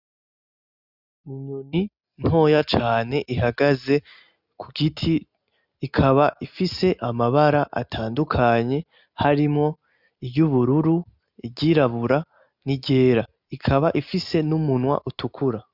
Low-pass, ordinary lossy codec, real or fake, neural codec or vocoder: 5.4 kHz; Opus, 64 kbps; real; none